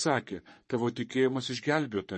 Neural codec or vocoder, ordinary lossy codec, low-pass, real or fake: codec, 44.1 kHz, 3.4 kbps, Pupu-Codec; MP3, 32 kbps; 10.8 kHz; fake